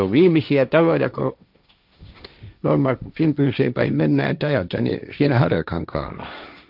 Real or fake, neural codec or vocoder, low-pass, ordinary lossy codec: fake; codec, 16 kHz, 1.1 kbps, Voila-Tokenizer; 5.4 kHz; none